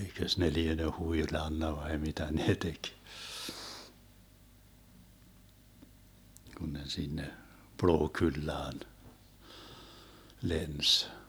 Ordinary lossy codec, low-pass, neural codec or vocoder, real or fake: none; none; none; real